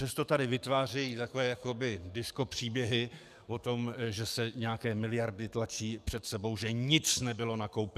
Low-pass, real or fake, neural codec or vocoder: 14.4 kHz; fake; codec, 44.1 kHz, 7.8 kbps, DAC